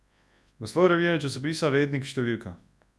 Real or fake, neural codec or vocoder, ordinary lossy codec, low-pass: fake; codec, 24 kHz, 0.9 kbps, WavTokenizer, large speech release; none; none